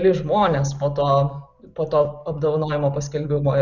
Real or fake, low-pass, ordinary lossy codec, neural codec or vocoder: real; 7.2 kHz; Opus, 64 kbps; none